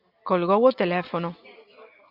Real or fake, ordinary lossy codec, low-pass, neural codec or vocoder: real; AAC, 48 kbps; 5.4 kHz; none